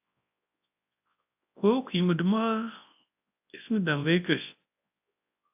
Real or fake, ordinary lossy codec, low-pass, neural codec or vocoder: fake; AAC, 32 kbps; 3.6 kHz; codec, 24 kHz, 0.9 kbps, WavTokenizer, large speech release